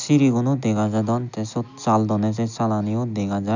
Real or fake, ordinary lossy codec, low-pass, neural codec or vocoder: real; none; 7.2 kHz; none